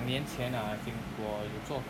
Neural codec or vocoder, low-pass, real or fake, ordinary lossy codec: none; 19.8 kHz; real; none